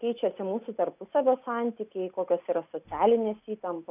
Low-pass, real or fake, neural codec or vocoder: 3.6 kHz; real; none